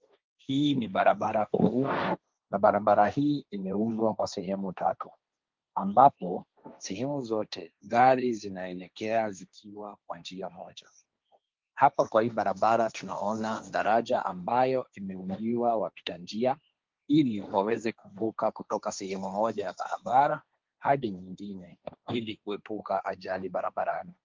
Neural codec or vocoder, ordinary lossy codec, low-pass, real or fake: codec, 16 kHz, 1.1 kbps, Voila-Tokenizer; Opus, 32 kbps; 7.2 kHz; fake